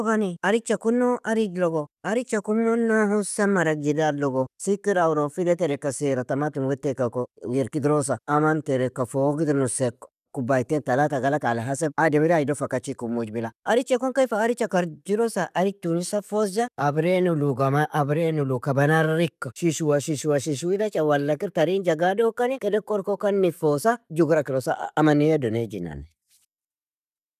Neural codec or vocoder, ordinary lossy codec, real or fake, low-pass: none; none; real; 14.4 kHz